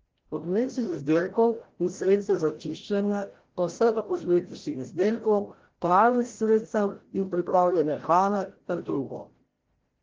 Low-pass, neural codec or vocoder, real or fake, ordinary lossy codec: 7.2 kHz; codec, 16 kHz, 0.5 kbps, FreqCodec, larger model; fake; Opus, 16 kbps